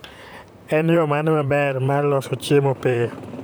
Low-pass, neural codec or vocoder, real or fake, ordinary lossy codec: none; vocoder, 44.1 kHz, 128 mel bands, Pupu-Vocoder; fake; none